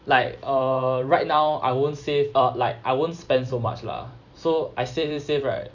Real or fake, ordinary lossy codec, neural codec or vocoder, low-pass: fake; none; vocoder, 44.1 kHz, 128 mel bands every 256 samples, BigVGAN v2; 7.2 kHz